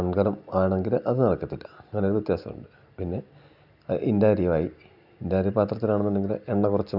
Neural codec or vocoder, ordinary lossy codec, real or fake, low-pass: none; none; real; 5.4 kHz